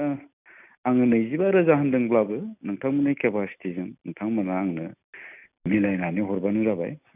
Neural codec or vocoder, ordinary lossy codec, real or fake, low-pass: none; none; real; 3.6 kHz